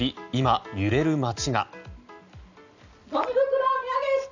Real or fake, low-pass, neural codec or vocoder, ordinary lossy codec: real; 7.2 kHz; none; none